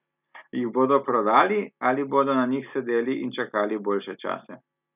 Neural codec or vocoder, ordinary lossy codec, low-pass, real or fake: none; none; 3.6 kHz; real